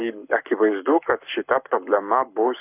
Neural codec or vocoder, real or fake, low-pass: codec, 44.1 kHz, 7.8 kbps, Pupu-Codec; fake; 3.6 kHz